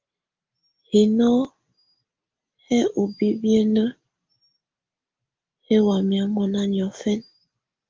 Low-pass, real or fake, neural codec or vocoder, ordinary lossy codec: 7.2 kHz; real; none; Opus, 32 kbps